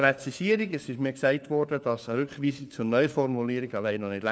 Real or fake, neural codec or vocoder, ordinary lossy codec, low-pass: fake; codec, 16 kHz, 4 kbps, FunCodec, trained on LibriTTS, 50 frames a second; none; none